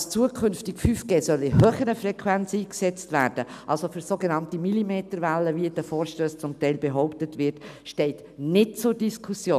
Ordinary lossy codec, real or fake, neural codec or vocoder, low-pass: none; real; none; 14.4 kHz